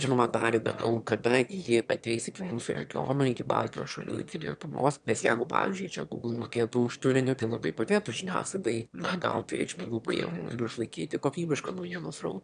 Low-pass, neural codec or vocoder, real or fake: 9.9 kHz; autoencoder, 22.05 kHz, a latent of 192 numbers a frame, VITS, trained on one speaker; fake